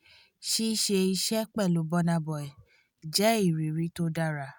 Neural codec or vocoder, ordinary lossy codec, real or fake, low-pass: none; none; real; none